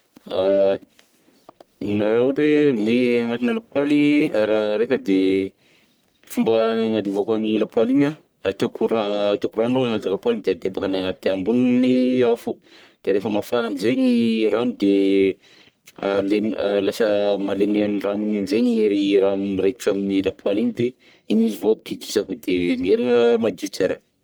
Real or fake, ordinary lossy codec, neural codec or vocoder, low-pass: fake; none; codec, 44.1 kHz, 1.7 kbps, Pupu-Codec; none